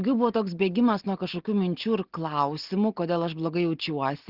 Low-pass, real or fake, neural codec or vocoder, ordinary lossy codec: 5.4 kHz; real; none; Opus, 16 kbps